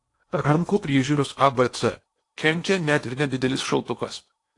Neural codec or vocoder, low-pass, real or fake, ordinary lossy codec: codec, 16 kHz in and 24 kHz out, 0.8 kbps, FocalCodec, streaming, 65536 codes; 10.8 kHz; fake; AAC, 48 kbps